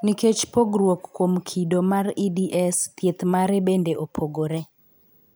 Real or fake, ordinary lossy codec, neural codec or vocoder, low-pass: fake; none; vocoder, 44.1 kHz, 128 mel bands every 512 samples, BigVGAN v2; none